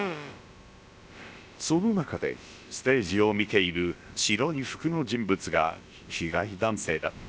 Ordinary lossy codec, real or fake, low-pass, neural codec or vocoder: none; fake; none; codec, 16 kHz, about 1 kbps, DyCAST, with the encoder's durations